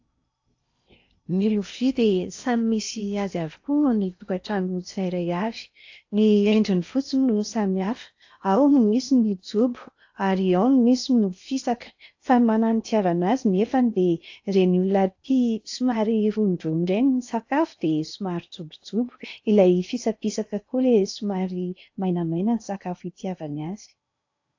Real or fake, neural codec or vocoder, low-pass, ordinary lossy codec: fake; codec, 16 kHz in and 24 kHz out, 0.8 kbps, FocalCodec, streaming, 65536 codes; 7.2 kHz; AAC, 48 kbps